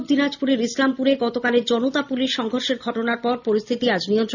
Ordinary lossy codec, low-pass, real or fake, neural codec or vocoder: none; 7.2 kHz; real; none